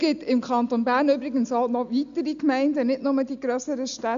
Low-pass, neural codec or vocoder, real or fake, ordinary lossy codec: 7.2 kHz; none; real; none